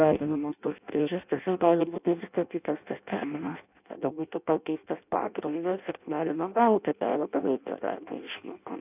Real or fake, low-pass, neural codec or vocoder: fake; 3.6 kHz; codec, 16 kHz in and 24 kHz out, 0.6 kbps, FireRedTTS-2 codec